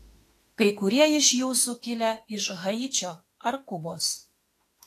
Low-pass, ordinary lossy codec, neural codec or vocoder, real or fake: 14.4 kHz; AAC, 48 kbps; autoencoder, 48 kHz, 32 numbers a frame, DAC-VAE, trained on Japanese speech; fake